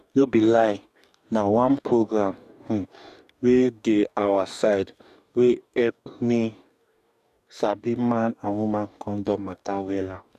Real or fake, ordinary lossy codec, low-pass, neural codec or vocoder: fake; none; 14.4 kHz; codec, 44.1 kHz, 2.6 kbps, DAC